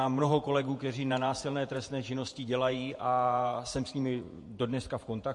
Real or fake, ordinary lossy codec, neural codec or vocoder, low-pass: fake; MP3, 48 kbps; vocoder, 48 kHz, 128 mel bands, Vocos; 10.8 kHz